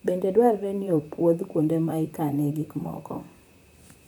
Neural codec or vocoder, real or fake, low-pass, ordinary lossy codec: vocoder, 44.1 kHz, 128 mel bands, Pupu-Vocoder; fake; none; none